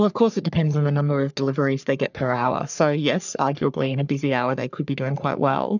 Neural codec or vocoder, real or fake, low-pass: codec, 44.1 kHz, 3.4 kbps, Pupu-Codec; fake; 7.2 kHz